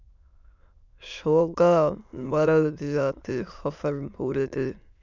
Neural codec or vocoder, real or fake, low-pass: autoencoder, 22.05 kHz, a latent of 192 numbers a frame, VITS, trained on many speakers; fake; 7.2 kHz